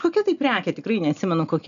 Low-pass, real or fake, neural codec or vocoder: 7.2 kHz; real; none